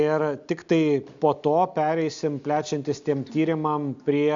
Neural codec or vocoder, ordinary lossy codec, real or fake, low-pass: none; AAC, 64 kbps; real; 7.2 kHz